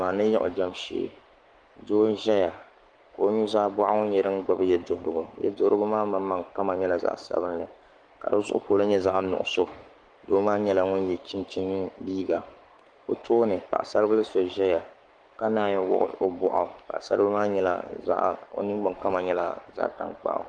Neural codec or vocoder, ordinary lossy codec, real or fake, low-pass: codec, 44.1 kHz, 7.8 kbps, Pupu-Codec; Opus, 24 kbps; fake; 9.9 kHz